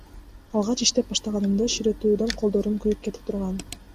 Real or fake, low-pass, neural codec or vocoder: real; 14.4 kHz; none